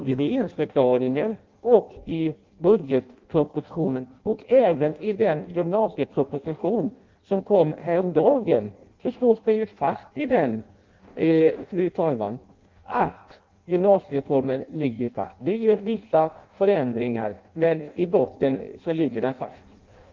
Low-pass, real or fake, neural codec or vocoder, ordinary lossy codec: 7.2 kHz; fake; codec, 16 kHz in and 24 kHz out, 0.6 kbps, FireRedTTS-2 codec; Opus, 32 kbps